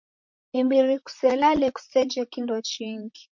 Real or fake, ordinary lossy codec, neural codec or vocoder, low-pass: fake; MP3, 48 kbps; vocoder, 44.1 kHz, 128 mel bands, Pupu-Vocoder; 7.2 kHz